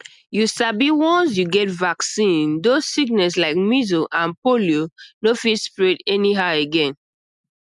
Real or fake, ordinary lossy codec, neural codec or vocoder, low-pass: real; none; none; 10.8 kHz